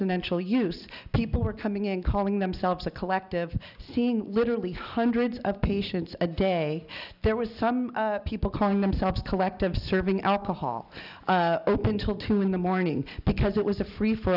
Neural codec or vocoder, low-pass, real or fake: none; 5.4 kHz; real